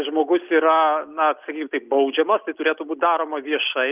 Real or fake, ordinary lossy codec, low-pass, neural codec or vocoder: real; Opus, 24 kbps; 3.6 kHz; none